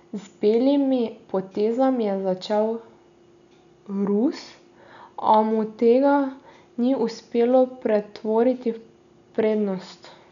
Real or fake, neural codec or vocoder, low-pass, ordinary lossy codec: real; none; 7.2 kHz; none